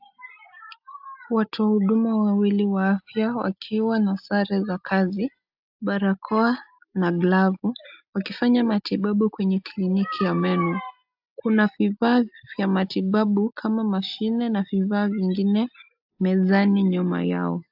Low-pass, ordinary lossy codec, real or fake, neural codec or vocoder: 5.4 kHz; AAC, 48 kbps; real; none